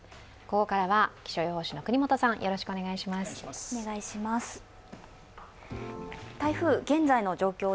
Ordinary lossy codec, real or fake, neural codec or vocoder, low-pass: none; real; none; none